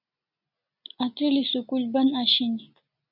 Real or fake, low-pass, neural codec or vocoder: real; 5.4 kHz; none